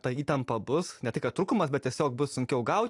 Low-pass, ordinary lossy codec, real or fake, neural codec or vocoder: 10.8 kHz; AAC, 64 kbps; real; none